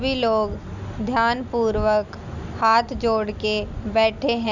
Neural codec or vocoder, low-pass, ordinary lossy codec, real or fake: none; 7.2 kHz; none; real